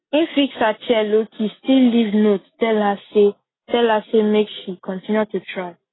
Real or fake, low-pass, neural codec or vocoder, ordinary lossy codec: real; 7.2 kHz; none; AAC, 16 kbps